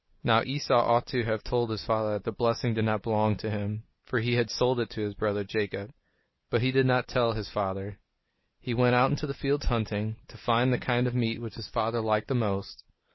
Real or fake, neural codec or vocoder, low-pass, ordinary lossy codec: real; none; 7.2 kHz; MP3, 24 kbps